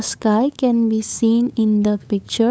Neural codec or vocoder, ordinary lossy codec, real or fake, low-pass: codec, 16 kHz, 8 kbps, FunCodec, trained on LibriTTS, 25 frames a second; none; fake; none